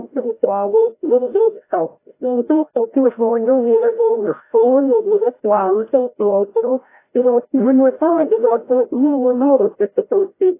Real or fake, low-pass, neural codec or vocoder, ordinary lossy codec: fake; 3.6 kHz; codec, 16 kHz, 0.5 kbps, FreqCodec, larger model; AAC, 24 kbps